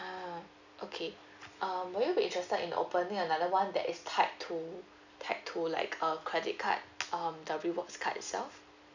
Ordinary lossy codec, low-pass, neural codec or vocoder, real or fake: none; 7.2 kHz; none; real